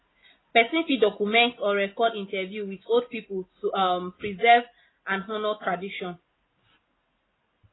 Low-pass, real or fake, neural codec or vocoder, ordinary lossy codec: 7.2 kHz; real; none; AAC, 16 kbps